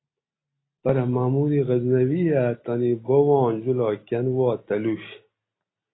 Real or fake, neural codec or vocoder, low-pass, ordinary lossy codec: real; none; 7.2 kHz; AAC, 16 kbps